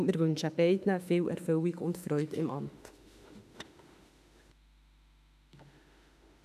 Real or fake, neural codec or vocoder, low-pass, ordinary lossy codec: fake; autoencoder, 48 kHz, 32 numbers a frame, DAC-VAE, trained on Japanese speech; 14.4 kHz; none